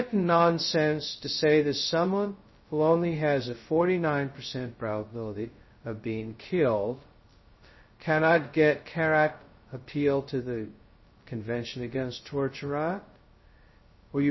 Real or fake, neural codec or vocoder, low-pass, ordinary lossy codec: fake; codec, 16 kHz, 0.2 kbps, FocalCodec; 7.2 kHz; MP3, 24 kbps